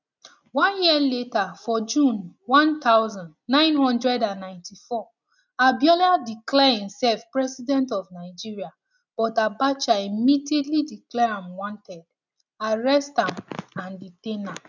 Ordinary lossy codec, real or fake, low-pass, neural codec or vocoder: none; real; 7.2 kHz; none